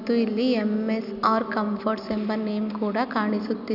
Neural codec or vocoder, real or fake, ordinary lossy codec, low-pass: none; real; none; 5.4 kHz